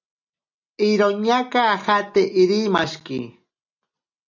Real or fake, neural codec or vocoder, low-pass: real; none; 7.2 kHz